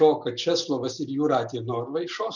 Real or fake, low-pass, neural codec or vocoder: real; 7.2 kHz; none